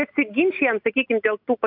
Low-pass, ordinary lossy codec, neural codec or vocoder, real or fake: 5.4 kHz; AAC, 48 kbps; none; real